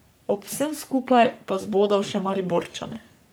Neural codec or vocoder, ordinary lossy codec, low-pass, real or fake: codec, 44.1 kHz, 3.4 kbps, Pupu-Codec; none; none; fake